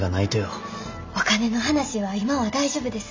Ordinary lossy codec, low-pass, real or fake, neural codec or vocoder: MP3, 64 kbps; 7.2 kHz; real; none